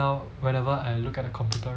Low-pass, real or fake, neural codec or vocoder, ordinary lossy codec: none; real; none; none